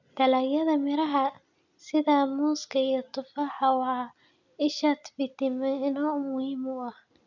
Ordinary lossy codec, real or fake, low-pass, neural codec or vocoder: none; real; 7.2 kHz; none